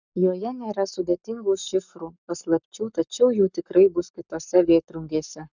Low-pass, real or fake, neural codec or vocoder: 7.2 kHz; fake; codec, 44.1 kHz, 7.8 kbps, Pupu-Codec